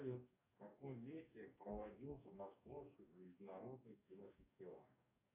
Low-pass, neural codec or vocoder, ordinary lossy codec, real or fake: 3.6 kHz; codec, 44.1 kHz, 2.6 kbps, DAC; AAC, 32 kbps; fake